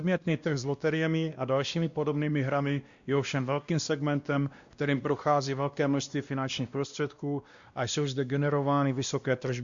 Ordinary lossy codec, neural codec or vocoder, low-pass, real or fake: Opus, 64 kbps; codec, 16 kHz, 1 kbps, X-Codec, WavLM features, trained on Multilingual LibriSpeech; 7.2 kHz; fake